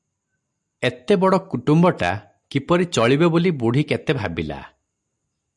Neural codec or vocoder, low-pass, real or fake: none; 10.8 kHz; real